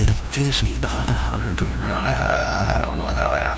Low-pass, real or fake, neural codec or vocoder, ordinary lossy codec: none; fake; codec, 16 kHz, 0.5 kbps, FunCodec, trained on LibriTTS, 25 frames a second; none